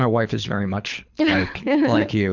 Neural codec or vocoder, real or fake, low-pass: codec, 24 kHz, 6 kbps, HILCodec; fake; 7.2 kHz